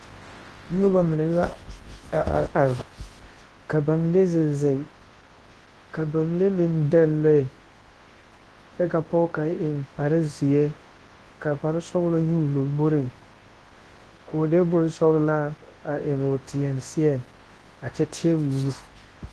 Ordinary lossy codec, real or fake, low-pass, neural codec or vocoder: Opus, 16 kbps; fake; 10.8 kHz; codec, 24 kHz, 0.9 kbps, WavTokenizer, large speech release